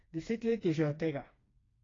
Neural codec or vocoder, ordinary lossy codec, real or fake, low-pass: codec, 16 kHz, 2 kbps, FreqCodec, smaller model; AAC, 32 kbps; fake; 7.2 kHz